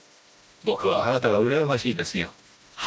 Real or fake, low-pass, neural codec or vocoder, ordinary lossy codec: fake; none; codec, 16 kHz, 1 kbps, FreqCodec, smaller model; none